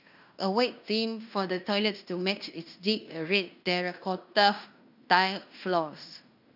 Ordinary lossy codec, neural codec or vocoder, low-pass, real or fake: none; codec, 16 kHz in and 24 kHz out, 0.9 kbps, LongCat-Audio-Codec, fine tuned four codebook decoder; 5.4 kHz; fake